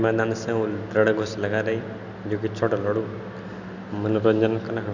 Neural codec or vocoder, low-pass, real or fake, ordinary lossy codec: none; 7.2 kHz; real; none